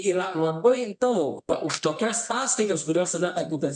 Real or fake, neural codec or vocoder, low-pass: fake; codec, 24 kHz, 0.9 kbps, WavTokenizer, medium music audio release; 10.8 kHz